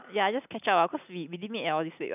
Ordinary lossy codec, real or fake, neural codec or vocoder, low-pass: none; real; none; 3.6 kHz